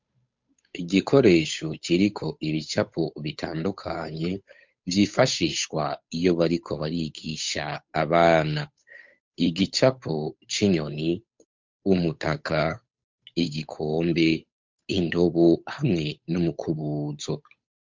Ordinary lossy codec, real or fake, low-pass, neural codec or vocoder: MP3, 64 kbps; fake; 7.2 kHz; codec, 16 kHz, 8 kbps, FunCodec, trained on Chinese and English, 25 frames a second